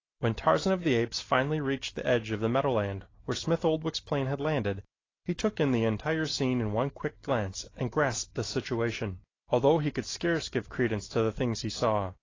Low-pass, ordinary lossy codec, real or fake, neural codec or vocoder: 7.2 kHz; AAC, 32 kbps; real; none